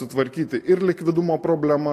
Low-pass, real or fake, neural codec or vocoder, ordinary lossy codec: 14.4 kHz; real; none; AAC, 48 kbps